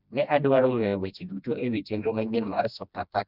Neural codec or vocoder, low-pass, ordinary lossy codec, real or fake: codec, 16 kHz, 1 kbps, FreqCodec, smaller model; 5.4 kHz; none; fake